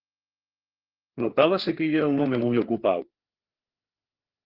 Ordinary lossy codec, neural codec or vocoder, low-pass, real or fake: Opus, 16 kbps; codec, 16 kHz, 4 kbps, FreqCodec, larger model; 5.4 kHz; fake